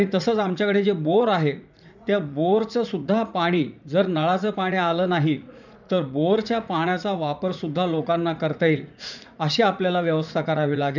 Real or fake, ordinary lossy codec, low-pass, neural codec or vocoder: fake; none; 7.2 kHz; vocoder, 44.1 kHz, 80 mel bands, Vocos